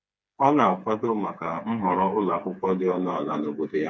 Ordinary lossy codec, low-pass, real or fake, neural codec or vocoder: none; none; fake; codec, 16 kHz, 4 kbps, FreqCodec, smaller model